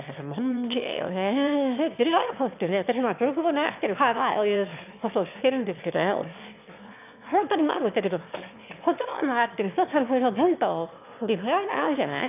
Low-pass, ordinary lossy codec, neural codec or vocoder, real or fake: 3.6 kHz; none; autoencoder, 22.05 kHz, a latent of 192 numbers a frame, VITS, trained on one speaker; fake